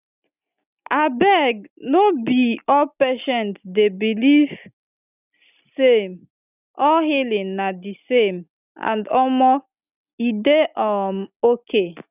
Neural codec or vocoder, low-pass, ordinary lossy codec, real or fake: none; 3.6 kHz; none; real